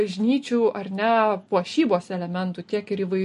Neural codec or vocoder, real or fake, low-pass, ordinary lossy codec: none; real; 14.4 kHz; MP3, 48 kbps